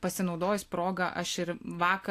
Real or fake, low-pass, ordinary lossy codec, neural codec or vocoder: real; 14.4 kHz; AAC, 64 kbps; none